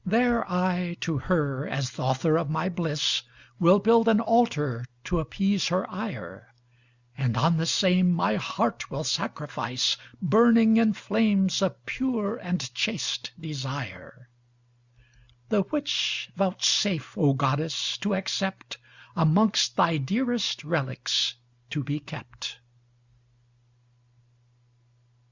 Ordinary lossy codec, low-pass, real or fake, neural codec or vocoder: Opus, 64 kbps; 7.2 kHz; real; none